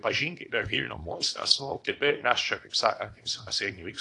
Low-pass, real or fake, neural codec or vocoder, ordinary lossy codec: 10.8 kHz; fake; codec, 24 kHz, 0.9 kbps, WavTokenizer, small release; AAC, 48 kbps